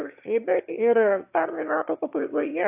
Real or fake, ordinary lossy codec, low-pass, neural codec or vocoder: fake; AAC, 32 kbps; 3.6 kHz; autoencoder, 22.05 kHz, a latent of 192 numbers a frame, VITS, trained on one speaker